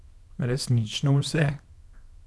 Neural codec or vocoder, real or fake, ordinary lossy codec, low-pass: codec, 24 kHz, 0.9 kbps, WavTokenizer, small release; fake; none; none